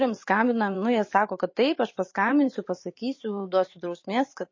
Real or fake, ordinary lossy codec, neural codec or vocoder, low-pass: fake; MP3, 32 kbps; vocoder, 44.1 kHz, 128 mel bands every 512 samples, BigVGAN v2; 7.2 kHz